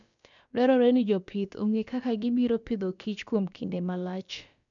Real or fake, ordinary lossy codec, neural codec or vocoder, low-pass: fake; none; codec, 16 kHz, about 1 kbps, DyCAST, with the encoder's durations; 7.2 kHz